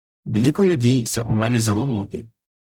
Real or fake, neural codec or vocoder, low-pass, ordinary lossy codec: fake; codec, 44.1 kHz, 0.9 kbps, DAC; 19.8 kHz; none